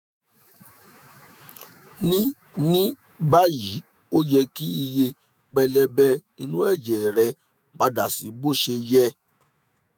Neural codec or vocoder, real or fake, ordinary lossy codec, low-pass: autoencoder, 48 kHz, 128 numbers a frame, DAC-VAE, trained on Japanese speech; fake; none; none